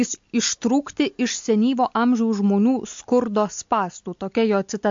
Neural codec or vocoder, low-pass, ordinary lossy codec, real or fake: none; 7.2 kHz; MP3, 48 kbps; real